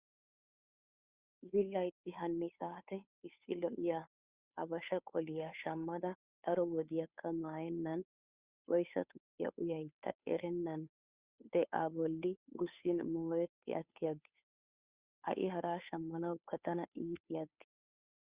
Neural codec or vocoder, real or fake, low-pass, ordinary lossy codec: codec, 16 kHz, 2 kbps, FunCodec, trained on Chinese and English, 25 frames a second; fake; 3.6 kHz; Opus, 64 kbps